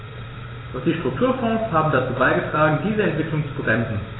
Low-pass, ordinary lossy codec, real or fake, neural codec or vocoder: 7.2 kHz; AAC, 16 kbps; fake; vocoder, 44.1 kHz, 128 mel bands every 256 samples, BigVGAN v2